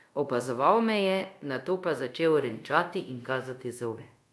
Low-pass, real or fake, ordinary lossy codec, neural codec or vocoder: none; fake; none; codec, 24 kHz, 0.5 kbps, DualCodec